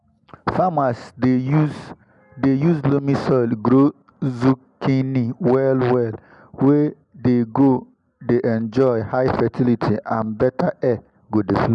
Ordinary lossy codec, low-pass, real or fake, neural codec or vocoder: none; 10.8 kHz; real; none